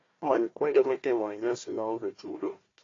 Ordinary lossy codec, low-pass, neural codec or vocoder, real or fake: AAC, 32 kbps; 7.2 kHz; codec, 16 kHz, 1 kbps, FunCodec, trained on Chinese and English, 50 frames a second; fake